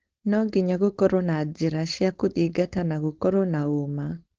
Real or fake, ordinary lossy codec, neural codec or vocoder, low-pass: fake; Opus, 16 kbps; codec, 16 kHz, 4.8 kbps, FACodec; 7.2 kHz